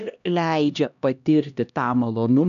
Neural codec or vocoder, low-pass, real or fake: codec, 16 kHz, 1 kbps, X-Codec, HuBERT features, trained on LibriSpeech; 7.2 kHz; fake